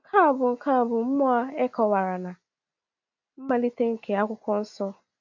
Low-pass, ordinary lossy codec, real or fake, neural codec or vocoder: 7.2 kHz; AAC, 48 kbps; real; none